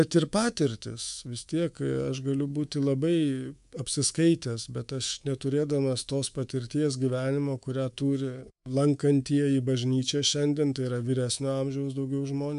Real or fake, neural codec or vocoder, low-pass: fake; codec, 24 kHz, 3.1 kbps, DualCodec; 10.8 kHz